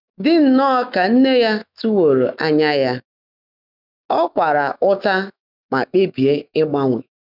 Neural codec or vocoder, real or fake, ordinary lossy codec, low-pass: autoencoder, 48 kHz, 128 numbers a frame, DAC-VAE, trained on Japanese speech; fake; none; 5.4 kHz